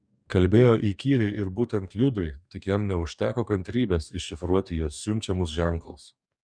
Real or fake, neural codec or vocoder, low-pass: fake; codec, 44.1 kHz, 2.6 kbps, DAC; 9.9 kHz